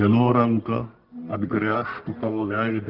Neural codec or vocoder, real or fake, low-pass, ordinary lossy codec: codec, 44.1 kHz, 1.7 kbps, Pupu-Codec; fake; 5.4 kHz; Opus, 32 kbps